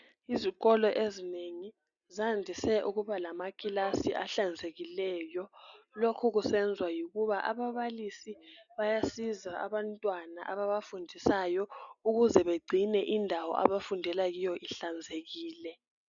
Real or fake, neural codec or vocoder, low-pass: real; none; 7.2 kHz